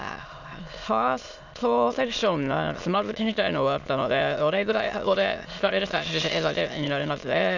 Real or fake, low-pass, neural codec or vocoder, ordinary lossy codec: fake; 7.2 kHz; autoencoder, 22.05 kHz, a latent of 192 numbers a frame, VITS, trained on many speakers; none